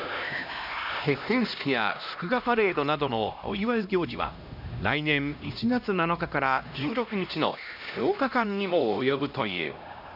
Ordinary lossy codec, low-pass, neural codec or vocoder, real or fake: none; 5.4 kHz; codec, 16 kHz, 1 kbps, X-Codec, HuBERT features, trained on LibriSpeech; fake